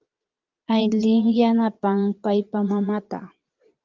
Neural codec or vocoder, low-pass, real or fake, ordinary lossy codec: vocoder, 22.05 kHz, 80 mel bands, Vocos; 7.2 kHz; fake; Opus, 32 kbps